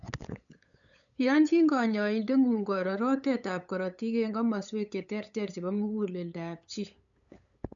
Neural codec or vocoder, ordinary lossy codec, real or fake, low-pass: codec, 16 kHz, 8 kbps, FunCodec, trained on LibriTTS, 25 frames a second; none; fake; 7.2 kHz